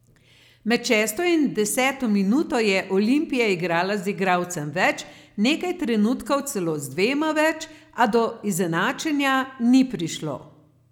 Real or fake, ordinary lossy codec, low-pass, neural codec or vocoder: real; none; 19.8 kHz; none